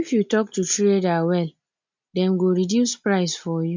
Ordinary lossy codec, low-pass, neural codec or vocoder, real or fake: MP3, 64 kbps; 7.2 kHz; none; real